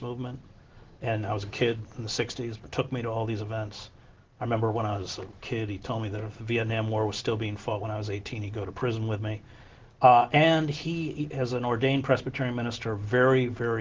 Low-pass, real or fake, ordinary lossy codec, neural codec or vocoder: 7.2 kHz; real; Opus, 16 kbps; none